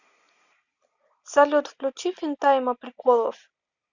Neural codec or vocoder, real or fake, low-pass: none; real; 7.2 kHz